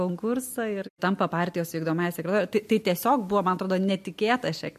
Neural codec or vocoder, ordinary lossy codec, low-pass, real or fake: none; MP3, 64 kbps; 14.4 kHz; real